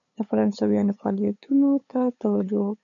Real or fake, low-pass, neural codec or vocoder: fake; 7.2 kHz; codec, 16 kHz, 8 kbps, FunCodec, trained on LibriTTS, 25 frames a second